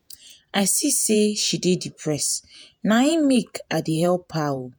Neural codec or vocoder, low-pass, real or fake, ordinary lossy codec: vocoder, 48 kHz, 128 mel bands, Vocos; none; fake; none